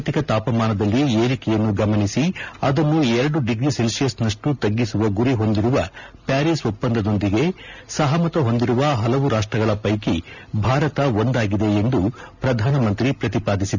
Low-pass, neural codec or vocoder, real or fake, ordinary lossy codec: 7.2 kHz; none; real; none